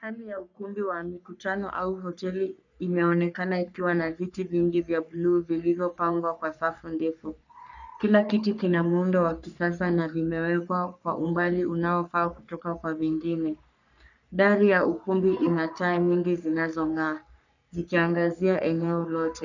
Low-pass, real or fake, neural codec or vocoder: 7.2 kHz; fake; codec, 44.1 kHz, 3.4 kbps, Pupu-Codec